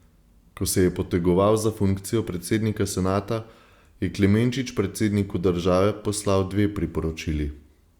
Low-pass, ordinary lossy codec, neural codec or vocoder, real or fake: 19.8 kHz; Opus, 64 kbps; none; real